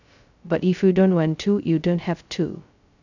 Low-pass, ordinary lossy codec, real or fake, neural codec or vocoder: 7.2 kHz; none; fake; codec, 16 kHz, 0.2 kbps, FocalCodec